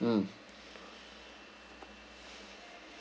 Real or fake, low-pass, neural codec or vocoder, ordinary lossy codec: real; none; none; none